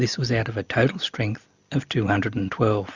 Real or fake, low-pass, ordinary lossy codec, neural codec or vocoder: real; 7.2 kHz; Opus, 64 kbps; none